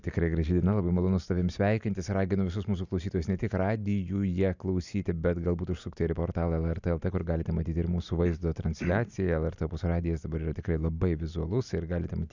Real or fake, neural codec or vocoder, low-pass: real; none; 7.2 kHz